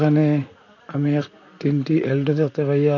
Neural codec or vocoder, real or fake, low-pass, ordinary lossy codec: vocoder, 44.1 kHz, 128 mel bands, Pupu-Vocoder; fake; 7.2 kHz; AAC, 48 kbps